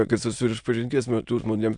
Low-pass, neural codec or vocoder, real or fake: 9.9 kHz; autoencoder, 22.05 kHz, a latent of 192 numbers a frame, VITS, trained on many speakers; fake